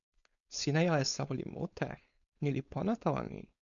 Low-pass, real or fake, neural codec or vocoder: 7.2 kHz; fake; codec, 16 kHz, 4.8 kbps, FACodec